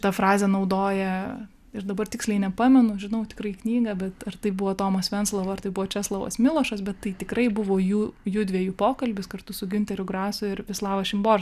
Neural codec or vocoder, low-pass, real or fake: none; 14.4 kHz; real